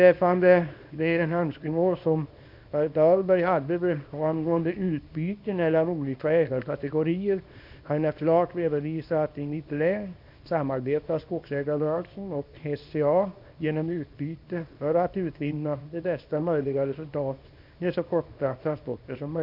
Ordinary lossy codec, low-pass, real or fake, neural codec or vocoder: none; 5.4 kHz; fake; codec, 24 kHz, 0.9 kbps, WavTokenizer, small release